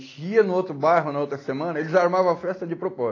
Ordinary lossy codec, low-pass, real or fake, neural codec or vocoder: AAC, 32 kbps; 7.2 kHz; real; none